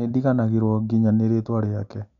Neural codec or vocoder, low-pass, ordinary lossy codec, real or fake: none; 7.2 kHz; none; real